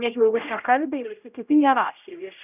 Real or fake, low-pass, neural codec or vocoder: fake; 3.6 kHz; codec, 16 kHz, 0.5 kbps, X-Codec, HuBERT features, trained on general audio